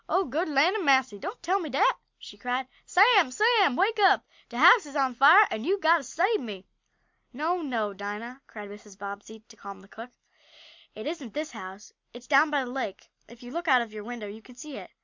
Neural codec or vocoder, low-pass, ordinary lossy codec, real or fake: none; 7.2 kHz; MP3, 64 kbps; real